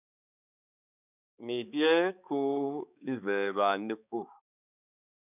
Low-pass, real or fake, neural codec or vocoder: 3.6 kHz; fake; codec, 16 kHz, 4 kbps, X-Codec, HuBERT features, trained on balanced general audio